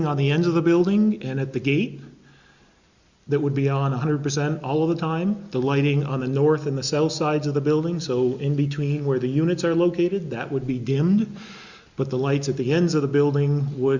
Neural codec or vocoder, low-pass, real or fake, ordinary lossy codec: none; 7.2 kHz; real; Opus, 64 kbps